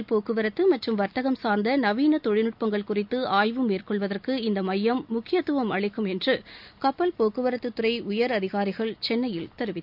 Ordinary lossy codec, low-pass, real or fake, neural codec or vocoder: none; 5.4 kHz; real; none